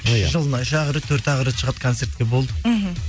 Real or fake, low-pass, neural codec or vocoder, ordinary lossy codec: real; none; none; none